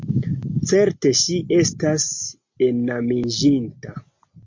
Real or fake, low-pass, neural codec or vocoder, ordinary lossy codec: real; 7.2 kHz; none; MP3, 48 kbps